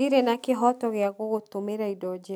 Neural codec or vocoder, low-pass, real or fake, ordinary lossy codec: none; none; real; none